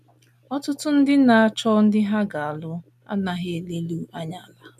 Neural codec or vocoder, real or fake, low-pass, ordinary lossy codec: none; real; 14.4 kHz; none